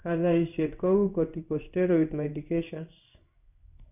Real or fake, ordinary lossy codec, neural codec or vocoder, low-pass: fake; none; codec, 16 kHz in and 24 kHz out, 1 kbps, XY-Tokenizer; 3.6 kHz